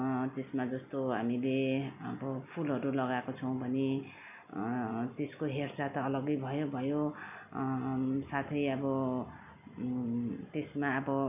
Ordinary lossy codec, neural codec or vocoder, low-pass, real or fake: none; none; 3.6 kHz; real